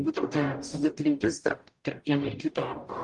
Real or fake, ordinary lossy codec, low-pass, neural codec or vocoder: fake; Opus, 32 kbps; 10.8 kHz; codec, 44.1 kHz, 0.9 kbps, DAC